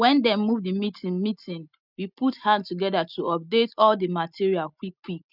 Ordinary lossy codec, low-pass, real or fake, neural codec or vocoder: none; 5.4 kHz; real; none